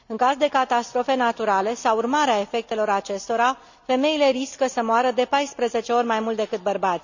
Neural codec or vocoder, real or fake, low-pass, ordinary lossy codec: none; real; 7.2 kHz; none